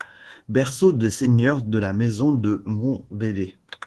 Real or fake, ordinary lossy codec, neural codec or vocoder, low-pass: fake; Opus, 16 kbps; codec, 24 kHz, 1.2 kbps, DualCodec; 10.8 kHz